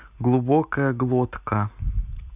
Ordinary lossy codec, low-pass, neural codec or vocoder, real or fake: none; 3.6 kHz; none; real